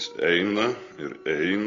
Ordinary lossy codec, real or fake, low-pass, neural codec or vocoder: AAC, 32 kbps; real; 7.2 kHz; none